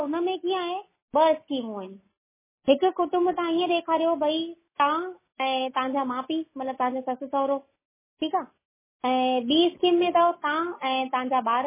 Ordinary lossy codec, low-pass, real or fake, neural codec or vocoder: MP3, 16 kbps; 3.6 kHz; real; none